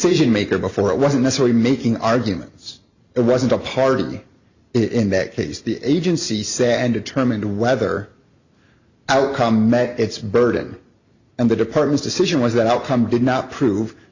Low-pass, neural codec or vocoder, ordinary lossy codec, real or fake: 7.2 kHz; none; Opus, 64 kbps; real